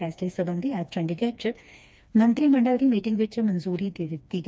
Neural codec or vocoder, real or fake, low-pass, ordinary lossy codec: codec, 16 kHz, 2 kbps, FreqCodec, smaller model; fake; none; none